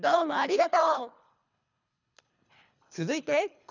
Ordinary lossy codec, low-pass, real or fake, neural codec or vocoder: none; 7.2 kHz; fake; codec, 24 kHz, 3 kbps, HILCodec